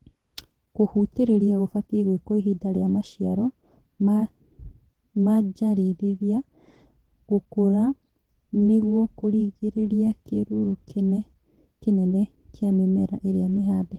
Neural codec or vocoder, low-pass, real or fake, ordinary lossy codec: vocoder, 48 kHz, 128 mel bands, Vocos; 19.8 kHz; fake; Opus, 16 kbps